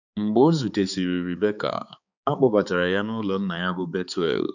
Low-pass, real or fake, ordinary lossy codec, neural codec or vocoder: 7.2 kHz; fake; none; codec, 16 kHz, 4 kbps, X-Codec, HuBERT features, trained on balanced general audio